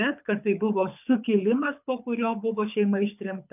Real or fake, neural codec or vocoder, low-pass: fake; codec, 24 kHz, 6 kbps, HILCodec; 3.6 kHz